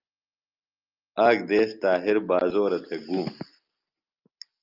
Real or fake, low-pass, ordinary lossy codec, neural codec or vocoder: real; 5.4 kHz; Opus, 24 kbps; none